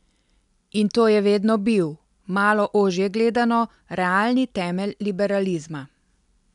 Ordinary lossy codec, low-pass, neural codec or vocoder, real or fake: none; 10.8 kHz; none; real